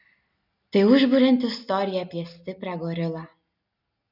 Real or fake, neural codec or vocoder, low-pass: real; none; 5.4 kHz